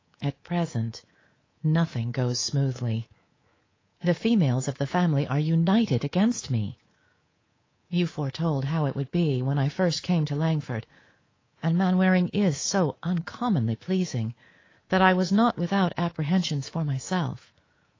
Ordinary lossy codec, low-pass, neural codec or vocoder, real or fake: AAC, 32 kbps; 7.2 kHz; codec, 24 kHz, 3.1 kbps, DualCodec; fake